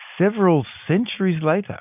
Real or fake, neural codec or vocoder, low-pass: real; none; 3.6 kHz